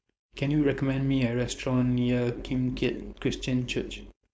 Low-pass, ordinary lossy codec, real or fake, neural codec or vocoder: none; none; fake; codec, 16 kHz, 4.8 kbps, FACodec